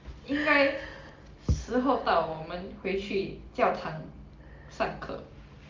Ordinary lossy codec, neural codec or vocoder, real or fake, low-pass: Opus, 32 kbps; none; real; 7.2 kHz